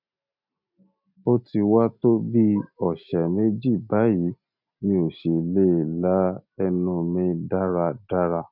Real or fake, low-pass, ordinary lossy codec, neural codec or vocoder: real; 5.4 kHz; none; none